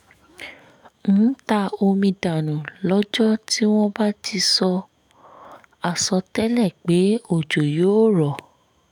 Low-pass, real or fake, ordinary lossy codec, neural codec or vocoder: 19.8 kHz; fake; none; autoencoder, 48 kHz, 128 numbers a frame, DAC-VAE, trained on Japanese speech